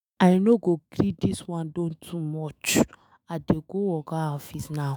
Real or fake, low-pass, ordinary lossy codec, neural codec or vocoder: fake; none; none; autoencoder, 48 kHz, 128 numbers a frame, DAC-VAE, trained on Japanese speech